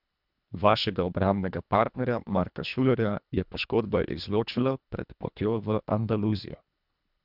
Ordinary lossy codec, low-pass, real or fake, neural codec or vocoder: none; 5.4 kHz; fake; codec, 24 kHz, 1.5 kbps, HILCodec